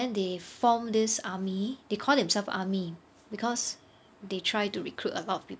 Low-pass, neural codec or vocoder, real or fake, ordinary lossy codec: none; none; real; none